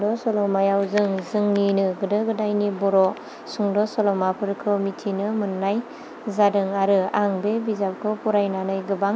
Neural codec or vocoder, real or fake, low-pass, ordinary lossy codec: none; real; none; none